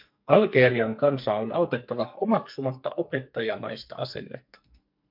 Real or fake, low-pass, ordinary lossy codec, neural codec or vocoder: fake; 5.4 kHz; MP3, 48 kbps; codec, 44.1 kHz, 2.6 kbps, SNAC